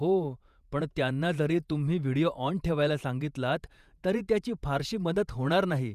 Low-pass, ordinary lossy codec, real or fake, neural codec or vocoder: 14.4 kHz; none; fake; vocoder, 48 kHz, 128 mel bands, Vocos